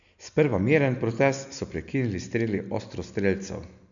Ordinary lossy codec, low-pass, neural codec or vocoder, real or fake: none; 7.2 kHz; none; real